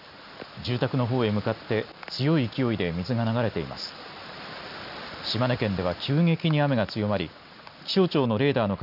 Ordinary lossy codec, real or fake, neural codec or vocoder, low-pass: none; real; none; 5.4 kHz